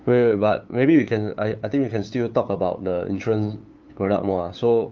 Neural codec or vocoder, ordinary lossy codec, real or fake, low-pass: codec, 16 kHz, 8 kbps, FunCodec, trained on LibriTTS, 25 frames a second; Opus, 32 kbps; fake; 7.2 kHz